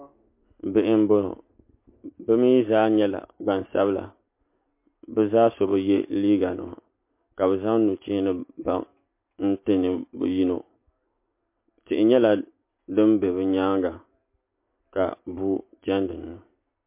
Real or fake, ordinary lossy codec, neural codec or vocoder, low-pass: real; MP3, 24 kbps; none; 3.6 kHz